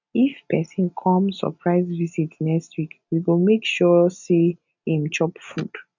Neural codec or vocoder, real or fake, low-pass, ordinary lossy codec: none; real; 7.2 kHz; none